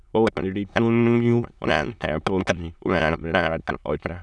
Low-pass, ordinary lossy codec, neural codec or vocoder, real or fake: none; none; autoencoder, 22.05 kHz, a latent of 192 numbers a frame, VITS, trained on many speakers; fake